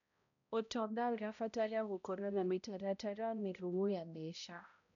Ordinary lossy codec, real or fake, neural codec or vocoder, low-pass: none; fake; codec, 16 kHz, 0.5 kbps, X-Codec, HuBERT features, trained on balanced general audio; 7.2 kHz